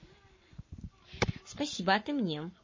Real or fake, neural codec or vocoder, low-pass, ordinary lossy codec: fake; codec, 44.1 kHz, 7.8 kbps, Pupu-Codec; 7.2 kHz; MP3, 32 kbps